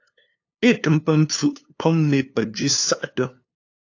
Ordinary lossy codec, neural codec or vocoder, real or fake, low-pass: AAC, 48 kbps; codec, 16 kHz, 2 kbps, FunCodec, trained on LibriTTS, 25 frames a second; fake; 7.2 kHz